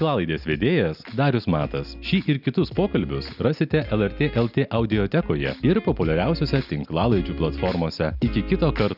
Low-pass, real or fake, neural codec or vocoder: 5.4 kHz; real; none